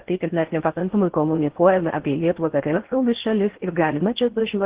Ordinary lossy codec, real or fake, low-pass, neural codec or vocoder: Opus, 16 kbps; fake; 3.6 kHz; codec, 16 kHz in and 24 kHz out, 0.6 kbps, FocalCodec, streaming, 4096 codes